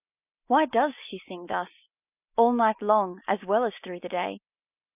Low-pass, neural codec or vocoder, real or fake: 3.6 kHz; none; real